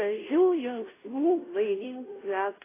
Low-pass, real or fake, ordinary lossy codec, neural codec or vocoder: 3.6 kHz; fake; AAC, 16 kbps; codec, 16 kHz, 0.5 kbps, FunCodec, trained on Chinese and English, 25 frames a second